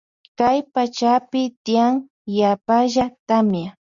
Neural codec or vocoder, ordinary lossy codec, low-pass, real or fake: none; Opus, 64 kbps; 7.2 kHz; real